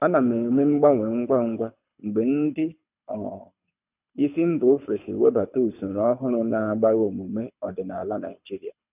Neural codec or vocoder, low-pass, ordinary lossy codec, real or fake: codec, 24 kHz, 6 kbps, HILCodec; 3.6 kHz; none; fake